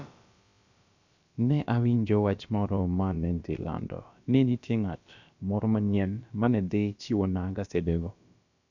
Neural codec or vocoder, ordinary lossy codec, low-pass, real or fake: codec, 16 kHz, about 1 kbps, DyCAST, with the encoder's durations; none; 7.2 kHz; fake